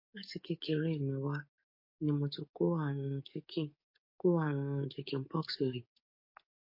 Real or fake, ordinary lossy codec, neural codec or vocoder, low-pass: fake; MP3, 32 kbps; codec, 44.1 kHz, 7.8 kbps, DAC; 5.4 kHz